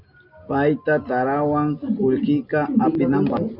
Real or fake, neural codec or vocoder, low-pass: real; none; 5.4 kHz